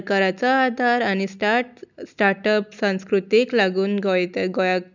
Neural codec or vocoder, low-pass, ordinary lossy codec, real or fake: none; 7.2 kHz; none; real